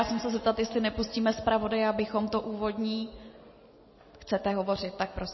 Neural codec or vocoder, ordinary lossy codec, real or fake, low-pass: none; MP3, 24 kbps; real; 7.2 kHz